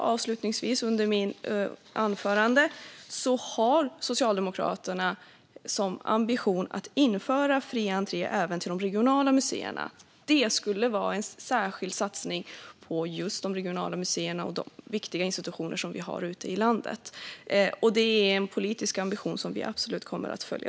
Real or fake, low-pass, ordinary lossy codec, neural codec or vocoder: real; none; none; none